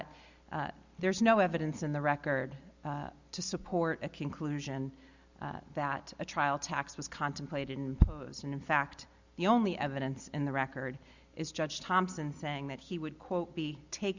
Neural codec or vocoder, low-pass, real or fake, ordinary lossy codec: none; 7.2 kHz; real; Opus, 64 kbps